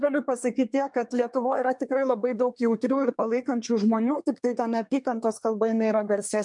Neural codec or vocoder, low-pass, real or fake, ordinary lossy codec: codec, 24 kHz, 1 kbps, SNAC; 10.8 kHz; fake; MP3, 64 kbps